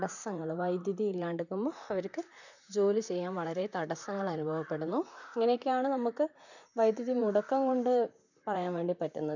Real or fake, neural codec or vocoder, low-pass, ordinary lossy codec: fake; vocoder, 44.1 kHz, 128 mel bands, Pupu-Vocoder; 7.2 kHz; none